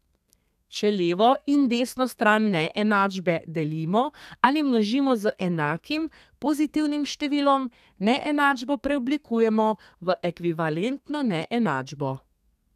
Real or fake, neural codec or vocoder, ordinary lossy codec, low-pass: fake; codec, 32 kHz, 1.9 kbps, SNAC; none; 14.4 kHz